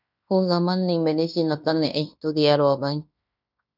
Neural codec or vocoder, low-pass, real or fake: codec, 16 kHz in and 24 kHz out, 0.9 kbps, LongCat-Audio-Codec, fine tuned four codebook decoder; 5.4 kHz; fake